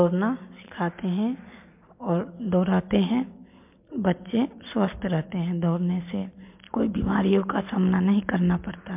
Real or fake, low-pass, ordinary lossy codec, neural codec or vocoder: real; 3.6 kHz; MP3, 32 kbps; none